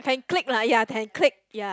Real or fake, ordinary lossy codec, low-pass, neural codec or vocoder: real; none; none; none